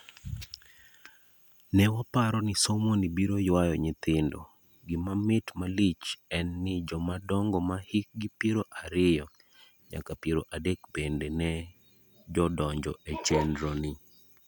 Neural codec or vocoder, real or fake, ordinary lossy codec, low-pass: none; real; none; none